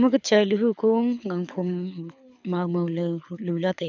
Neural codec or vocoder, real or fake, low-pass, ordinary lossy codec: codec, 24 kHz, 6 kbps, HILCodec; fake; 7.2 kHz; none